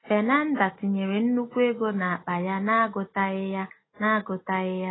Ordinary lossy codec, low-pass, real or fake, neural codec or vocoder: AAC, 16 kbps; 7.2 kHz; real; none